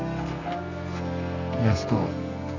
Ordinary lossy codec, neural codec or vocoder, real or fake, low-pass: none; codec, 32 kHz, 1.9 kbps, SNAC; fake; 7.2 kHz